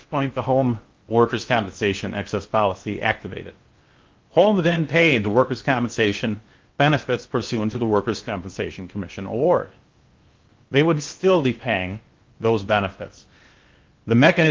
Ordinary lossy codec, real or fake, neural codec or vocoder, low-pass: Opus, 32 kbps; fake; codec, 16 kHz in and 24 kHz out, 0.8 kbps, FocalCodec, streaming, 65536 codes; 7.2 kHz